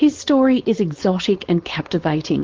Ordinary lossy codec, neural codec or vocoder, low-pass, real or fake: Opus, 16 kbps; codec, 16 kHz, 4.8 kbps, FACodec; 7.2 kHz; fake